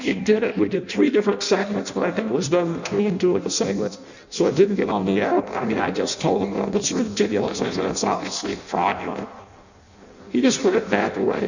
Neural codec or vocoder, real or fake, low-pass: codec, 16 kHz in and 24 kHz out, 0.6 kbps, FireRedTTS-2 codec; fake; 7.2 kHz